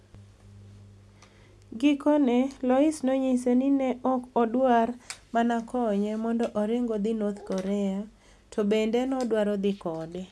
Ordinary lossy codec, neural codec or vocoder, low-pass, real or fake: none; none; none; real